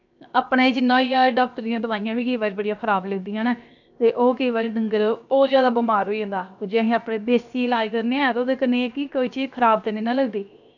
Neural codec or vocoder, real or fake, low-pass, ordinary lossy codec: codec, 16 kHz, 0.7 kbps, FocalCodec; fake; 7.2 kHz; none